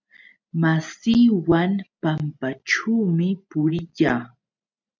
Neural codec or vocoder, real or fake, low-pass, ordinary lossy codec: none; real; 7.2 kHz; MP3, 64 kbps